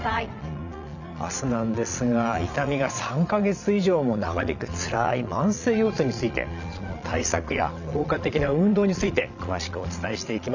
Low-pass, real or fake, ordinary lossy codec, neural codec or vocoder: 7.2 kHz; fake; none; vocoder, 22.05 kHz, 80 mel bands, Vocos